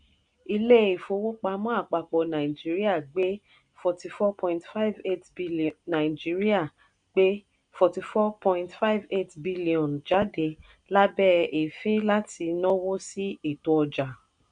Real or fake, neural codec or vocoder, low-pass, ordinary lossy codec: real; none; 9.9 kHz; none